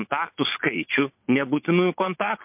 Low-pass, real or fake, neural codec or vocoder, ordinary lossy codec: 3.6 kHz; fake; vocoder, 22.05 kHz, 80 mel bands, Vocos; MP3, 32 kbps